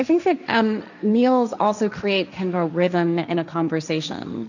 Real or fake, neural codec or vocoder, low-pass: fake; codec, 16 kHz, 1.1 kbps, Voila-Tokenizer; 7.2 kHz